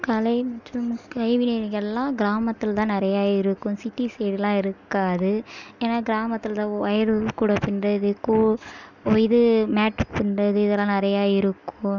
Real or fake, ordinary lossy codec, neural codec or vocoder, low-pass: real; none; none; 7.2 kHz